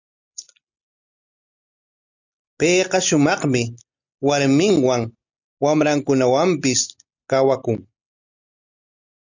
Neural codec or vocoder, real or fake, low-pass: none; real; 7.2 kHz